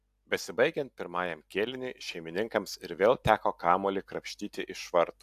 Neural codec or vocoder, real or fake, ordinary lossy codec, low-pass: none; real; AAC, 96 kbps; 14.4 kHz